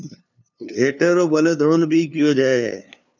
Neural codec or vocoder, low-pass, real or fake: codec, 16 kHz, 2 kbps, FunCodec, trained on LibriTTS, 25 frames a second; 7.2 kHz; fake